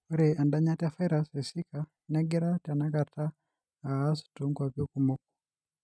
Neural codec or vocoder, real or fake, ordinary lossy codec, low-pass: none; real; none; none